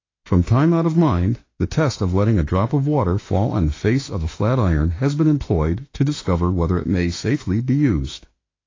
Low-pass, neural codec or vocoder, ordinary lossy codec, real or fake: 7.2 kHz; autoencoder, 48 kHz, 32 numbers a frame, DAC-VAE, trained on Japanese speech; AAC, 32 kbps; fake